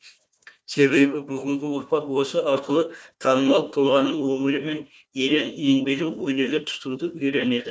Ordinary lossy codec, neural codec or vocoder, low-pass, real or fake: none; codec, 16 kHz, 1 kbps, FunCodec, trained on Chinese and English, 50 frames a second; none; fake